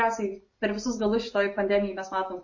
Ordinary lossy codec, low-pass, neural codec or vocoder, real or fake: MP3, 32 kbps; 7.2 kHz; none; real